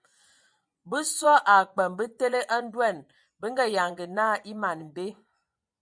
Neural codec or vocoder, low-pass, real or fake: vocoder, 24 kHz, 100 mel bands, Vocos; 9.9 kHz; fake